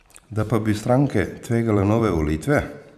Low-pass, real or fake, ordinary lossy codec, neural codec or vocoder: 14.4 kHz; fake; none; vocoder, 44.1 kHz, 128 mel bands every 512 samples, BigVGAN v2